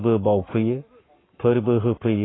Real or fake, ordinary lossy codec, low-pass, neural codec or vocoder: fake; AAC, 16 kbps; 7.2 kHz; codec, 44.1 kHz, 7.8 kbps, Pupu-Codec